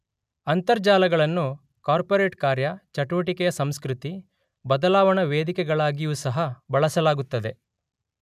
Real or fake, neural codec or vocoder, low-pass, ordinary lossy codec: real; none; 14.4 kHz; none